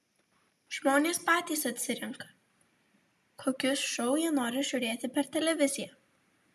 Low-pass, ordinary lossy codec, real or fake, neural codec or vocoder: 14.4 kHz; MP3, 96 kbps; real; none